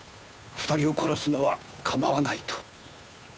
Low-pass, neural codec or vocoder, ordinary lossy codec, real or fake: none; codec, 16 kHz, 2 kbps, FunCodec, trained on Chinese and English, 25 frames a second; none; fake